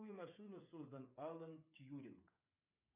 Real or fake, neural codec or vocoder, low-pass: fake; codec, 16 kHz, 8 kbps, FreqCodec, smaller model; 3.6 kHz